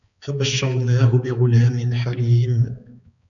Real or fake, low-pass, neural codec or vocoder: fake; 7.2 kHz; codec, 16 kHz, 4 kbps, X-Codec, HuBERT features, trained on balanced general audio